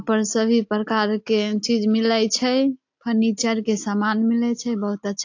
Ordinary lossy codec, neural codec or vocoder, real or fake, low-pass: AAC, 48 kbps; none; real; 7.2 kHz